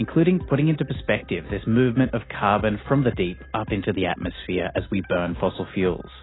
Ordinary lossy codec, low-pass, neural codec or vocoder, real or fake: AAC, 16 kbps; 7.2 kHz; none; real